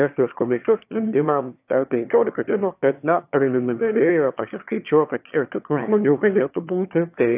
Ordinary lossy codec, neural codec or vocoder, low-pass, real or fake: AAC, 32 kbps; autoencoder, 22.05 kHz, a latent of 192 numbers a frame, VITS, trained on one speaker; 3.6 kHz; fake